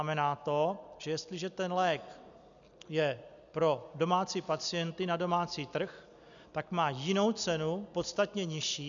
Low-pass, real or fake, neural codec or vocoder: 7.2 kHz; real; none